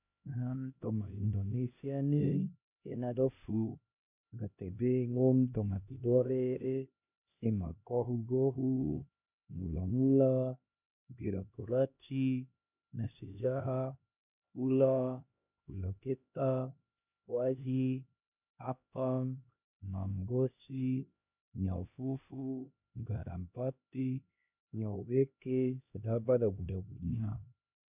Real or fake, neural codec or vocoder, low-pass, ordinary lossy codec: fake; codec, 16 kHz, 1 kbps, X-Codec, HuBERT features, trained on LibriSpeech; 3.6 kHz; none